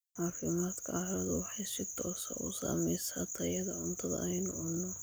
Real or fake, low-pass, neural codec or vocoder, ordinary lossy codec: real; none; none; none